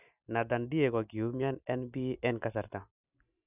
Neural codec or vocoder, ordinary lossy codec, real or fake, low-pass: none; none; real; 3.6 kHz